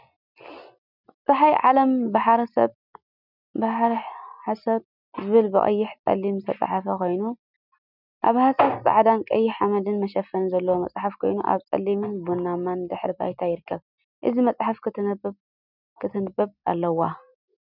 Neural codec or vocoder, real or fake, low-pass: none; real; 5.4 kHz